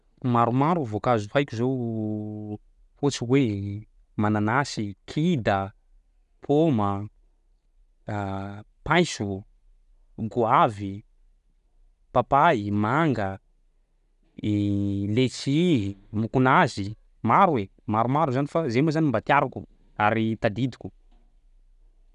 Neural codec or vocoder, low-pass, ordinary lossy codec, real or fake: none; 10.8 kHz; none; real